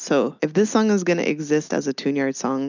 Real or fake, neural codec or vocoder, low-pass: real; none; 7.2 kHz